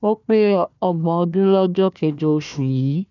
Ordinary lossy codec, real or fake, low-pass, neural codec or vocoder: none; fake; 7.2 kHz; codec, 16 kHz, 1 kbps, FunCodec, trained on Chinese and English, 50 frames a second